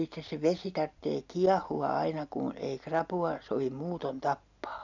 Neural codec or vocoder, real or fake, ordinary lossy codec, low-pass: none; real; none; 7.2 kHz